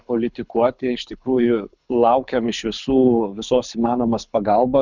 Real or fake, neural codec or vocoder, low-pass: fake; codec, 24 kHz, 6 kbps, HILCodec; 7.2 kHz